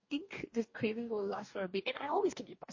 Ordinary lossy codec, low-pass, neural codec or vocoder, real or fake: MP3, 32 kbps; 7.2 kHz; codec, 44.1 kHz, 2.6 kbps, DAC; fake